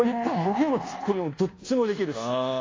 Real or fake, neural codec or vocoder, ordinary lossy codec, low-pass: fake; codec, 24 kHz, 1.2 kbps, DualCodec; AAC, 32 kbps; 7.2 kHz